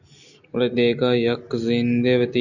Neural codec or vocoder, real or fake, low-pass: none; real; 7.2 kHz